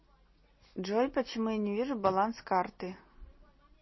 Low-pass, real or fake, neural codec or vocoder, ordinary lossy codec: 7.2 kHz; real; none; MP3, 24 kbps